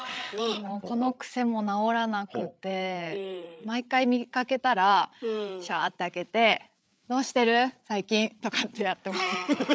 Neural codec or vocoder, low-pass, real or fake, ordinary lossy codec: codec, 16 kHz, 8 kbps, FreqCodec, larger model; none; fake; none